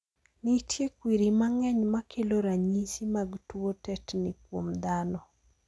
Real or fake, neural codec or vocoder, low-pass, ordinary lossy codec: real; none; none; none